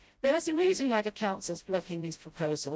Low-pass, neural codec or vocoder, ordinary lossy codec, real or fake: none; codec, 16 kHz, 0.5 kbps, FreqCodec, smaller model; none; fake